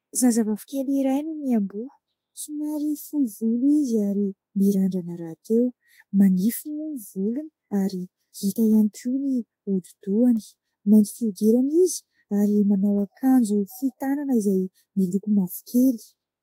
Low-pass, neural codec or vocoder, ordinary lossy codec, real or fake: 19.8 kHz; autoencoder, 48 kHz, 32 numbers a frame, DAC-VAE, trained on Japanese speech; AAC, 48 kbps; fake